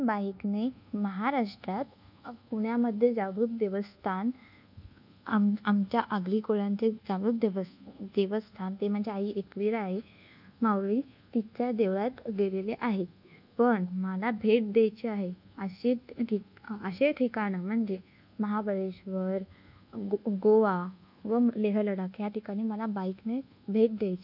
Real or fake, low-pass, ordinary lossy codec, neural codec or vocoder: fake; 5.4 kHz; none; codec, 24 kHz, 1.2 kbps, DualCodec